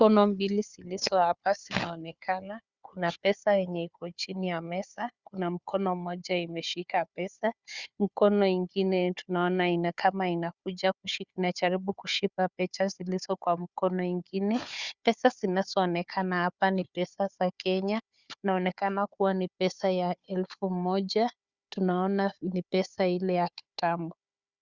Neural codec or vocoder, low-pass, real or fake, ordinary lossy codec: codec, 16 kHz, 4 kbps, FunCodec, trained on Chinese and English, 50 frames a second; 7.2 kHz; fake; Opus, 64 kbps